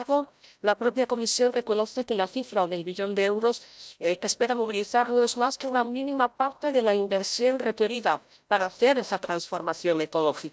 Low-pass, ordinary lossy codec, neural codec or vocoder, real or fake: none; none; codec, 16 kHz, 0.5 kbps, FreqCodec, larger model; fake